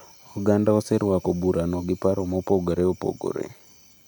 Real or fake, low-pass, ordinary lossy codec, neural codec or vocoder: real; 19.8 kHz; none; none